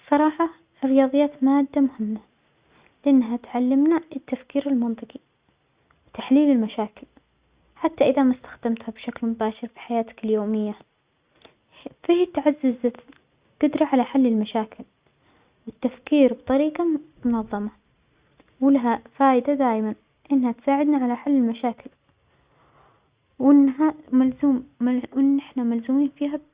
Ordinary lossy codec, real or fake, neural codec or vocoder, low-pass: Opus, 64 kbps; real; none; 3.6 kHz